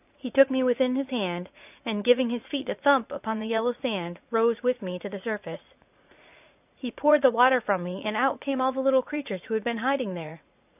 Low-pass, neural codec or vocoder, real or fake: 3.6 kHz; vocoder, 44.1 kHz, 80 mel bands, Vocos; fake